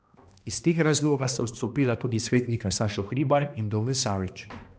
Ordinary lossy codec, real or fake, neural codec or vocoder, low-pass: none; fake; codec, 16 kHz, 1 kbps, X-Codec, HuBERT features, trained on balanced general audio; none